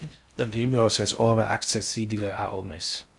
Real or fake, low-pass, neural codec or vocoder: fake; 10.8 kHz; codec, 16 kHz in and 24 kHz out, 0.6 kbps, FocalCodec, streaming, 4096 codes